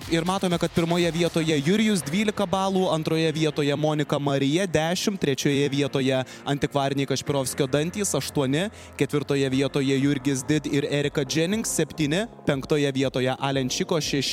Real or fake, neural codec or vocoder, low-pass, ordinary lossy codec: fake; vocoder, 44.1 kHz, 128 mel bands every 256 samples, BigVGAN v2; 19.8 kHz; MP3, 96 kbps